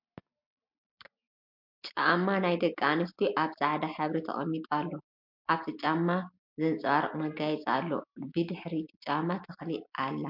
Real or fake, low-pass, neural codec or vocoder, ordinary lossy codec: real; 5.4 kHz; none; MP3, 48 kbps